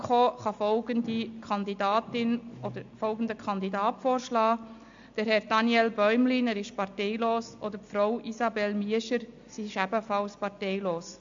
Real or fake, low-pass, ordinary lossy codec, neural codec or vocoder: real; 7.2 kHz; AAC, 64 kbps; none